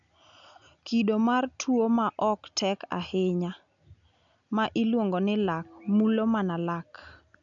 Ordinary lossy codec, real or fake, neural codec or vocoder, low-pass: none; real; none; 7.2 kHz